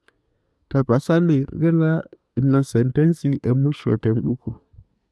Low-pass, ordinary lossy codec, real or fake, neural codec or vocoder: none; none; fake; codec, 24 kHz, 1 kbps, SNAC